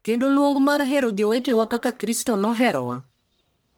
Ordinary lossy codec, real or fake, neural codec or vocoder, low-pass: none; fake; codec, 44.1 kHz, 1.7 kbps, Pupu-Codec; none